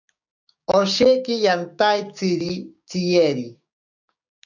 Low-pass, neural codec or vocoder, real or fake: 7.2 kHz; codec, 16 kHz, 6 kbps, DAC; fake